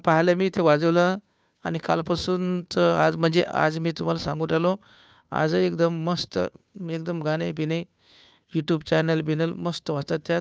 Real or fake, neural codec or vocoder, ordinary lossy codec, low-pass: fake; codec, 16 kHz, 2 kbps, FunCodec, trained on Chinese and English, 25 frames a second; none; none